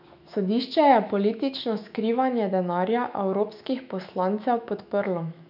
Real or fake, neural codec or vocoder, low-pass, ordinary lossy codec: fake; autoencoder, 48 kHz, 128 numbers a frame, DAC-VAE, trained on Japanese speech; 5.4 kHz; none